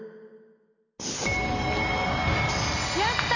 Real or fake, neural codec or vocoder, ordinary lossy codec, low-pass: real; none; none; 7.2 kHz